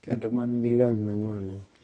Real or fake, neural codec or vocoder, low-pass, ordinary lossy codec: fake; codec, 24 kHz, 0.9 kbps, WavTokenizer, medium music audio release; 10.8 kHz; AAC, 32 kbps